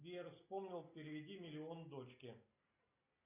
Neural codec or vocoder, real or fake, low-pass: none; real; 3.6 kHz